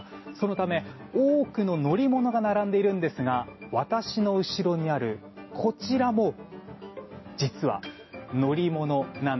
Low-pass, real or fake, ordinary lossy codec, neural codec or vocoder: 7.2 kHz; real; MP3, 24 kbps; none